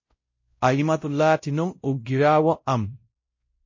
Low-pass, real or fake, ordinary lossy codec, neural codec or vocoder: 7.2 kHz; fake; MP3, 32 kbps; codec, 16 kHz, 0.5 kbps, X-Codec, WavLM features, trained on Multilingual LibriSpeech